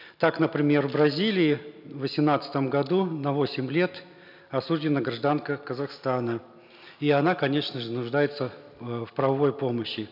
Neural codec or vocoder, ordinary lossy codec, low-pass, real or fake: none; none; 5.4 kHz; real